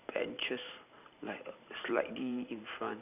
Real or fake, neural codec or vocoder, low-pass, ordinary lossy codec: fake; vocoder, 44.1 kHz, 128 mel bands every 512 samples, BigVGAN v2; 3.6 kHz; none